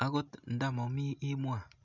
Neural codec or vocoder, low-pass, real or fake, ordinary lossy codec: none; 7.2 kHz; real; none